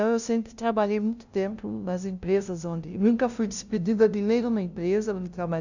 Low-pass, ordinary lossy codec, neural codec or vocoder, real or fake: 7.2 kHz; none; codec, 16 kHz, 0.5 kbps, FunCodec, trained on LibriTTS, 25 frames a second; fake